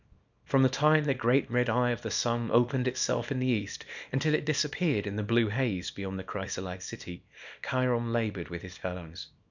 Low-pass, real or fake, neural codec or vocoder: 7.2 kHz; fake; codec, 24 kHz, 0.9 kbps, WavTokenizer, small release